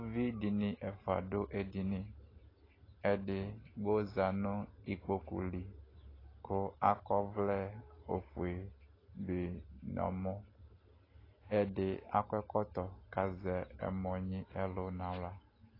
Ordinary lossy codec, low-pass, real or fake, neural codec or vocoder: AAC, 24 kbps; 5.4 kHz; real; none